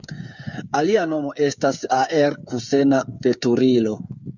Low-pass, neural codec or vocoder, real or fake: 7.2 kHz; codec, 16 kHz, 8 kbps, FreqCodec, smaller model; fake